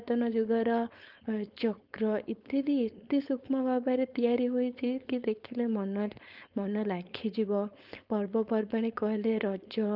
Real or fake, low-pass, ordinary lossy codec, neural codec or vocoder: fake; 5.4 kHz; Opus, 24 kbps; codec, 16 kHz, 4.8 kbps, FACodec